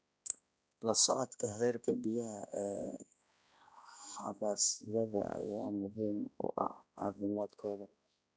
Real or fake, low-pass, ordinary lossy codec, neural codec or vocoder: fake; none; none; codec, 16 kHz, 1 kbps, X-Codec, HuBERT features, trained on balanced general audio